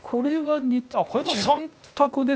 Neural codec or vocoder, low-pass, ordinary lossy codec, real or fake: codec, 16 kHz, 0.8 kbps, ZipCodec; none; none; fake